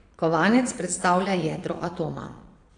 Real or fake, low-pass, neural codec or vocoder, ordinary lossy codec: fake; 9.9 kHz; vocoder, 22.05 kHz, 80 mel bands, Vocos; AAC, 48 kbps